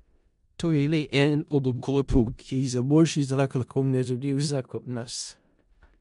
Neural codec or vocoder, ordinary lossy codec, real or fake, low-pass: codec, 16 kHz in and 24 kHz out, 0.4 kbps, LongCat-Audio-Codec, four codebook decoder; MP3, 64 kbps; fake; 10.8 kHz